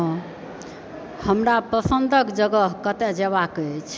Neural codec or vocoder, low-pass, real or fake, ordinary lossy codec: none; none; real; none